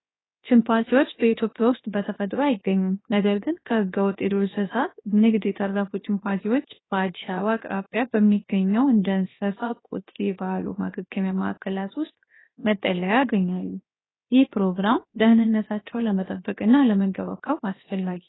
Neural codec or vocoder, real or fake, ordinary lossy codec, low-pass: codec, 16 kHz, 0.7 kbps, FocalCodec; fake; AAC, 16 kbps; 7.2 kHz